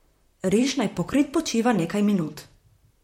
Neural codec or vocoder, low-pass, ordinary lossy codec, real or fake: vocoder, 44.1 kHz, 128 mel bands, Pupu-Vocoder; 19.8 kHz; MP3, 64 kbps; fake